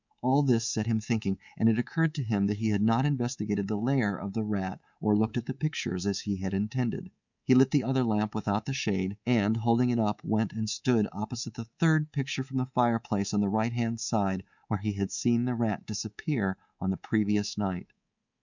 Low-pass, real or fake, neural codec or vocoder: 7.2 kHz; fake; codec, 24 kHz, 3.1 kbps, DualCodec